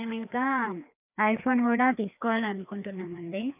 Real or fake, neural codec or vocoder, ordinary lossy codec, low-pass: fake; codec, 16 kHz, 2 kbps, FreqCodec, larger model; none; 3.6 kHz